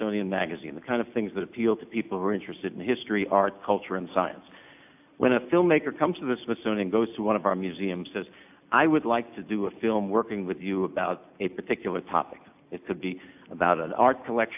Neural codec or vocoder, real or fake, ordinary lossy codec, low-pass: none; real; AAC, 32 kbps; 3.6 kHz